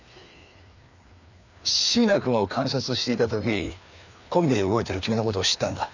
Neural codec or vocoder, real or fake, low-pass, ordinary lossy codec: codec, 16 kHz, 2 kbps, FreqCodec, larger model; fake; 7.2 kHz; none